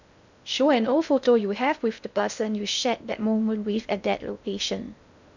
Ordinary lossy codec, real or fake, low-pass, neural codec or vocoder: none; fake; 7.2 kHz; codec, 16 kHz in and 24 kHz out, 0.6 kbps, FocalCodec, streaming, 2048 codes